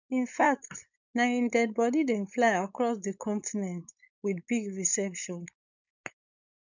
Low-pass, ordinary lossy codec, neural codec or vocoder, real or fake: 7.2 kHz; none; codec, 16 kHz, 4.8 kbps, FACodec; fake